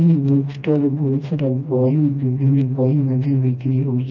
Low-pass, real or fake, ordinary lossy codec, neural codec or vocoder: 7.2 kHz; fake; none; codec, 16 kHz, 1 kbps, FreqCodec, smaller model